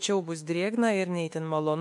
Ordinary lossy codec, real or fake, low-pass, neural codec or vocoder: MP3, 64 kbps; fake; 10.8 kHz; autoencoder, 48 kHz, 32 numbers a frame, DAC-VAE, trained on Japanese speech